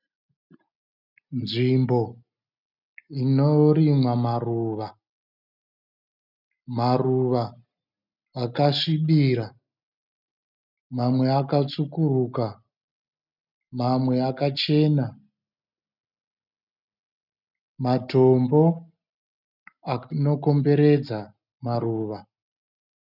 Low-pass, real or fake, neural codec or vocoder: 5.4 kHz; real; none